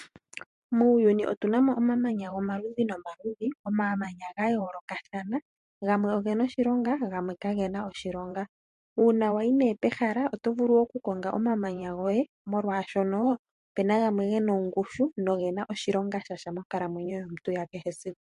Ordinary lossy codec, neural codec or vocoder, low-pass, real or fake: MP3, 48 kbps; vocoder, 44.1 kHz, 128 mel bands every 512 samples, BigVGAN v2; 14.4 kHz; fake